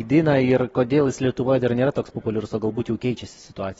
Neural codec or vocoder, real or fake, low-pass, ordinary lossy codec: none; real; 19.8 kHz; AAC, 24 kbps